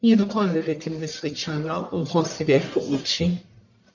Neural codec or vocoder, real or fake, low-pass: codec, 44.1 kHz, 1.7 kbps, Pupu-Codec; fake; 7.2 kHz